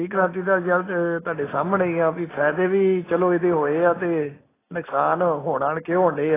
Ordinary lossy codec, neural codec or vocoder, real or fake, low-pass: AAC, 16 kbps; none; real; 3.6 kHz